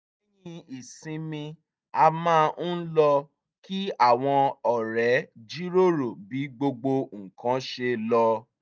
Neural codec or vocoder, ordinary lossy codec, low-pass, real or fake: none; none; none; real